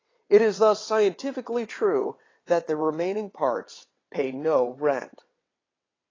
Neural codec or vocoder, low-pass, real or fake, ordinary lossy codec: vocoder, 22.05 kHz, 80 mel bands, WaveNeXt; 7.2 kHz; fake; AAC, 32 kbps